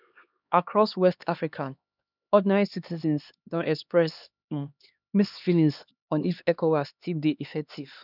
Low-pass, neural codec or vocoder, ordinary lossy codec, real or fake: 5.4 kHz; codec, 16 kHz, 2 kbps, X-Codec, HuBERT features, trained on LibriSpeech; none; fake